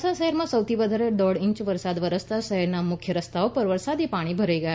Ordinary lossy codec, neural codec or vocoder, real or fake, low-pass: none; none; real; none